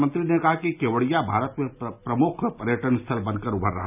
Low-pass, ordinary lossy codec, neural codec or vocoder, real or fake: 3.6 kHz; none; none; real